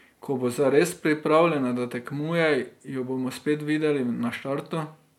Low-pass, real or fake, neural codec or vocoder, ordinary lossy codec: 19.8 kHz; real; none; MP3, 96 kbps